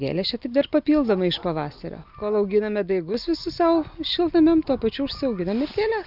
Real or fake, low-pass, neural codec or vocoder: real; 5.4 kHz; none